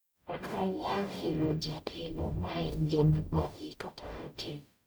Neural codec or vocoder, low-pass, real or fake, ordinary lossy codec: codec, 44.1 kHz, 0.9 kbps, DAC; none; fake; none